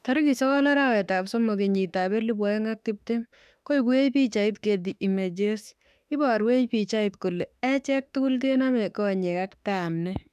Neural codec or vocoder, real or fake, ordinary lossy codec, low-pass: autoencoder, 48 kHz, 32 numbers a frame, DAC-VAE, trained on Japanese speech; fake; none; 14.4 kHz